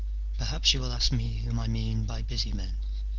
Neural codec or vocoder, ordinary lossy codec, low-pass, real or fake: none; Opus, 16 kbps; 7.2 kHz; real